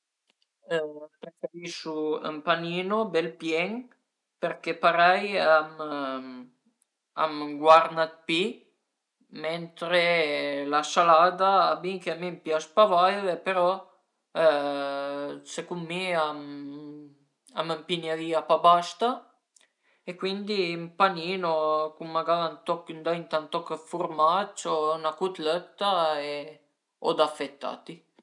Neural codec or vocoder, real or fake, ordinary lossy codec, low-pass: none; real; none; 10.8 kHz